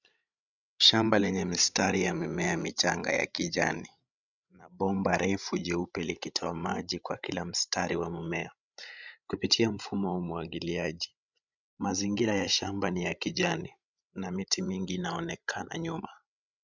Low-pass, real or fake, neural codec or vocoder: 7.2 kHz; fake; codec, 16 kHz, 16 kbps, FreqCodec, larger model